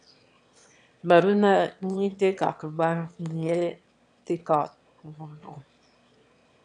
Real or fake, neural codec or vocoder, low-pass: fake; autoencoder, 22.05 kHz, a latent of 192 numbers a frame, VITS, trained on one speaker; 9.9 kHz